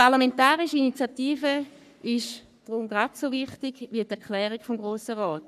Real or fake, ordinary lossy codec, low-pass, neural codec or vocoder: fake; none; 14.4 kHz; codec, 44.1 kHz, 3.4 kbps, Pupu-Codec